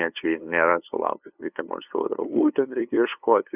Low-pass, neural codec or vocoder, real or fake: 3.6 kHz; codec, 16 kHz, 2 kbps, FunCodec, trained on LibriTTS, 25 frames a second; fake